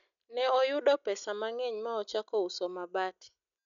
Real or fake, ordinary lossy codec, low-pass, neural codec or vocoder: real; none; 7.2 kHz; none